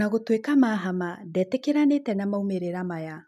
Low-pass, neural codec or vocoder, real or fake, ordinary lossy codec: 14.4 kHz; none; real; none